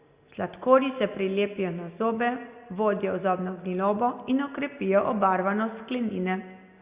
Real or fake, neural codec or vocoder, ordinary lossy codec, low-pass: real; none; Opus, 64 kbps; 3.6 kHz